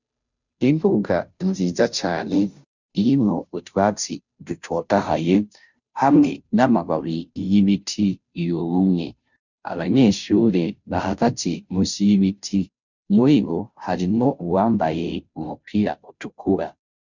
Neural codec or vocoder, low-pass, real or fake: codec, 16 kHz, 0.5 kbps, FunCodec, trained on Chinese and English, 25 frames a second; 7.2 kHz; fake